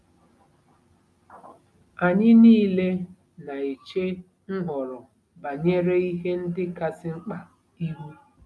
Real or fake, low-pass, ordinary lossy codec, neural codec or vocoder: real; none; none; none